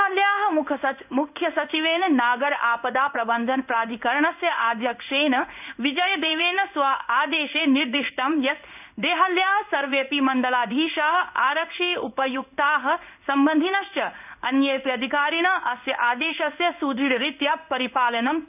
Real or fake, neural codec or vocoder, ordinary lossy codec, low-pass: fake; codec, 16 kHz in and 24 kHz out, 1 kbps, XY-Tokenizer; none; 3.6 kHz